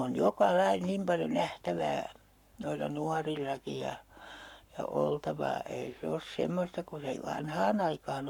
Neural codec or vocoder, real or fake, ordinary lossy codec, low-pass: codec, 44.1 kHz, 7.8 kbps, Pupu-Codec; fake; none; 19.8 kHz